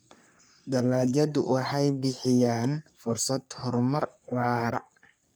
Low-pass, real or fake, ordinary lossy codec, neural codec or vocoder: none; fake; none; codec, 44.1 kHz, 3.4 kbps, Pupu-Codec